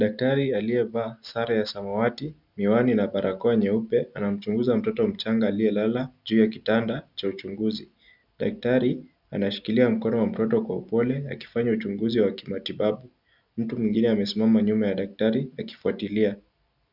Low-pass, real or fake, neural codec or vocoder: 5.4 kHz; real; none